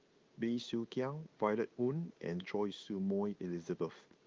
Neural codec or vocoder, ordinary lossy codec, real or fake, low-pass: codec, 16 kHz in and 24 kHz out, 1 kbps, XY-Tokenizer; Opus, 32 kbps; fake; 7.2 kHz